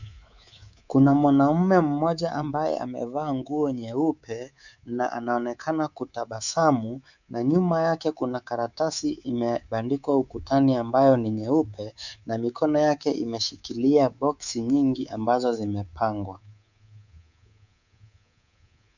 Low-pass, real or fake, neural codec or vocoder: 7.2 kHz; fake; codec, 24 kHz, 3.1 kbps, DualCodec